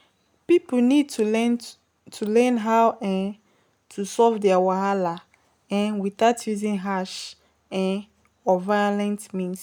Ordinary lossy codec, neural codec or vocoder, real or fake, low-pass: none; none; real; none